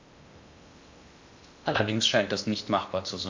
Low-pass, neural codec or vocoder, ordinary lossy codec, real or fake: 7.2 kHz; codec, 16 kHz in and 24 kHz out, 0.8 kbps, FocalCodec, streaming, 65536 codes; MP3, 64 kbps; fake